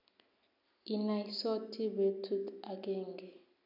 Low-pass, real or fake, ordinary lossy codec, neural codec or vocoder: 5.4 kHz; real; none; none